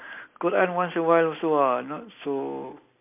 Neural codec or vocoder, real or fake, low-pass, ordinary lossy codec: none; real; 3.6 kHz; MP3, 32 kbps